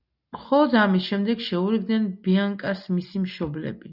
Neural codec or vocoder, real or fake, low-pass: none; real; 5.4 kHz